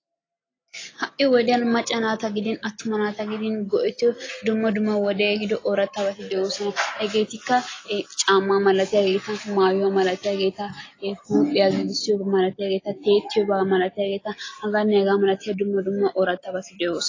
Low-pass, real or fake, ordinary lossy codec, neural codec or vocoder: 7.2 kHz; real; AAC, 32 kbps; none